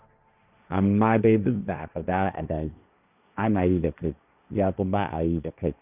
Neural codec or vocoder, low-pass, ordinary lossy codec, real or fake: codec, 16 kHz, 1.1 kbps, Voila-Tokenizer; 3.6 kHz; none; fake